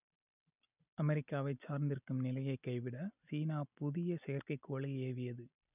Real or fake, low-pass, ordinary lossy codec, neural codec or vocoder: real; 3.6 kHz; none; none